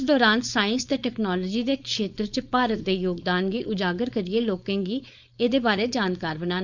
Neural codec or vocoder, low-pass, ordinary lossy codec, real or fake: codec, 16 kHz, 4.8 kbps, FACodec; 7.2 kHz; none; fake